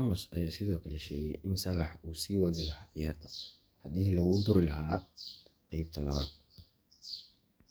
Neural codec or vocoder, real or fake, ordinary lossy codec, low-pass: codec, 44.1 kHz, 2.6 kbps, SNAC; fake; none; none